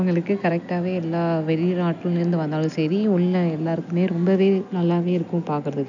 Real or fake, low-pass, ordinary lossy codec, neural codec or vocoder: real; 7.2 kHz; none; none